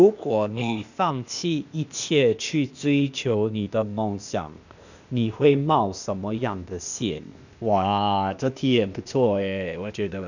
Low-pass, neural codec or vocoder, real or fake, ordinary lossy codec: 7.2 kHz; codec, 16 kHz, 0.8 kbps, ZipCodec; fake; none